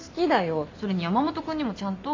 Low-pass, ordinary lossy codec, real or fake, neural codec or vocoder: 7.2 kHz; MP3, 64 kbps; real; none